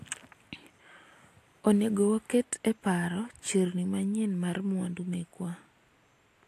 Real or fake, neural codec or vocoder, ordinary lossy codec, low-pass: real; none; AAC, 64 kbps; 14.4 kHz